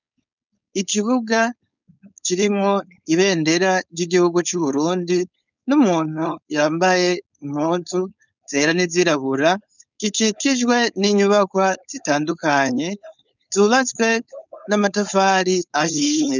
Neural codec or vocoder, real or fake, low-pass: codec, 16 kHz, 4.8 kbps, FACodec; fake; 7.2 kHz